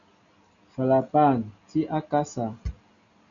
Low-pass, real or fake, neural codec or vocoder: 7.2 kHz; real; none